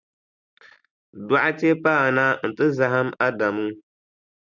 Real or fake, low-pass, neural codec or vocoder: real; 7.2 kHz; none